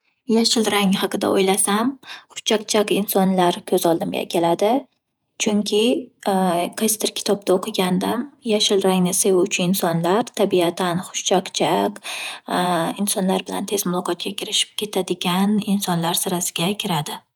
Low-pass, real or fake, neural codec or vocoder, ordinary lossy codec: none; fake; vocoder, 48 kHz, 128 mel bands, Vocos; none